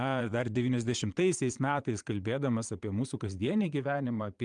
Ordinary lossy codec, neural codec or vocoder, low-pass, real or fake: Opus, 32 kbps; vocoder, 22.05 kHz, 80 mel bands, Vocos; 9.9 kHz; fake